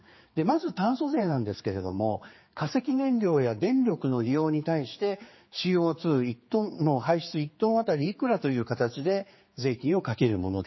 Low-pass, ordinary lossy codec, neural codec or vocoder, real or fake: 7.2 kHz; MP3, 24 kbps; codec, 16 kHz, 4 kbps, X-Codec, HuBERT features, trained on general audio; fake